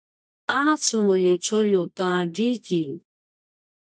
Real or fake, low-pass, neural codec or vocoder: fake; 9.9 kHz; codec, 24 kHz, 0.9 kbps, WavTokenizer, medium music audio release